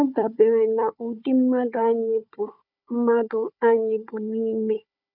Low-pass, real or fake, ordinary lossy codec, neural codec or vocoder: 5.4 kHz; fake; none; codec, 16 kHz, 4 kbps, FunCodec, trained on Chinese and English, 50 frames a second